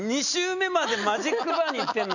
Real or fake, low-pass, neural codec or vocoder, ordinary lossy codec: real; 7.2 kHz; none; none